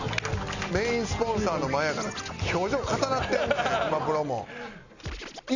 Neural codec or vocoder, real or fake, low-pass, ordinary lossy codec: none; real; 7.2 kHz; none